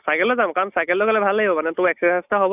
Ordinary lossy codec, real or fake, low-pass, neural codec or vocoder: none; real; 3.6 kHz; none